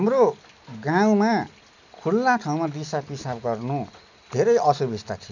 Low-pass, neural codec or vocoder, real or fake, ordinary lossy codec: 7.2 kHz; none; real; none